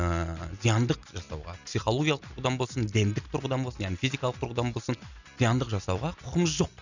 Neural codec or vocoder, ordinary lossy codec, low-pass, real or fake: none; none; 7.2 kHz; real